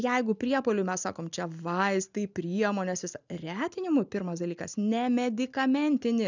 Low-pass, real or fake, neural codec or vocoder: 7.2 kHz; real; none